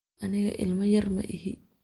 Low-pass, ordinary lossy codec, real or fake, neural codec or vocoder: 19.8 kHz; Opus, 24 kbps; real; none